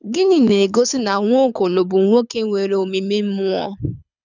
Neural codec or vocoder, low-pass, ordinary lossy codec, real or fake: codec, 24 kHz, 6 kbps, HILCodec; 7.2 kHz; none; fake